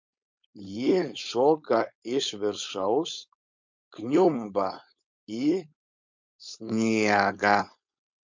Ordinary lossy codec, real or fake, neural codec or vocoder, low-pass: MP3, 64 kbps; fake; codec, 16 kHz, 4.8 kbps, FACodec; 7.2 kHz